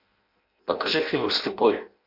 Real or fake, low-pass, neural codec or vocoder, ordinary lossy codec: fake; 5.4 kHz; codec, 16 kHz in and 24 kHz out, 0.6 kbps, FireRedTTS-2 codec; MP3, 48 kbps